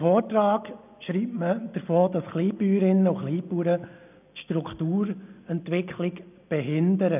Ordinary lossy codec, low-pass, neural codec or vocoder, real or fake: none; 3.6 kHz; none; real